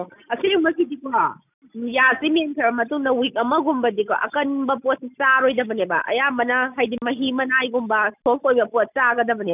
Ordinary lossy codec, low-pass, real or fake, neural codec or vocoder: none; 3.6 kHz; real; none